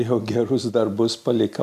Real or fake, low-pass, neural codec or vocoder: real; 14.4 kHz; none